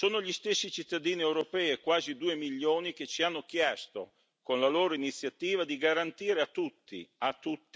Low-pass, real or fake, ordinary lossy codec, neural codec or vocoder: none; real; none; none